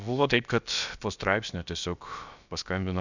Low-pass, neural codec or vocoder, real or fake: 7.2 kHz; codec, 16 kHz, about 1 kbps, DyCAST, with the encoder's durations; fake